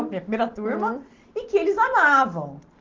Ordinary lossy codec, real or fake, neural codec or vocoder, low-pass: Opus, 16 kbps; real; none; 7.2 kHz